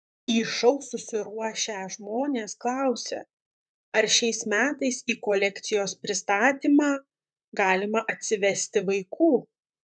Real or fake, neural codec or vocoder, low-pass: fake; vocoder, 44.1 kHz, 128 mel bands, Pupu-Vocoder; 9.9 kHz